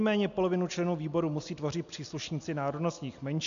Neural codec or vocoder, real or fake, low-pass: none; real; 7.2 kHz